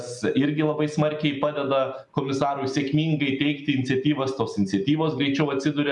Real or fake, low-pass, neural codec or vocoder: real; 10.8 kHz; none